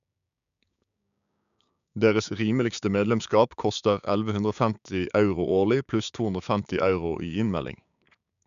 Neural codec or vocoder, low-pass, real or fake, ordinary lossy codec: codec, 16 kHz, 6 kbps, DAC; 7.2 kHz; fake; none